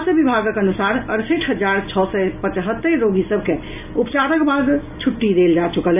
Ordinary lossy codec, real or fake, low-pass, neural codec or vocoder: none; real; 3.6 kHz; none